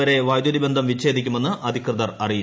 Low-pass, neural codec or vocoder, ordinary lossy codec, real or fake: none; none; none; real